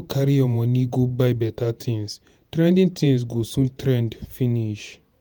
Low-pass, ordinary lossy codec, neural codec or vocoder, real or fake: none; none; vocoder, 48 kHz, 128 mel bands, Vocos; fake